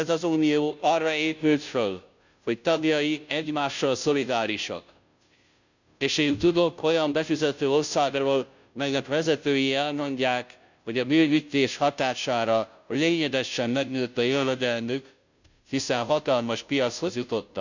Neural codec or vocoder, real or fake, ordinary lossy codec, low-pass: codec, 16 kHz, 0.5 kbps, FunCodec, trained on Chinese and English, 25 frames a second; fake; none; 7.2 kHz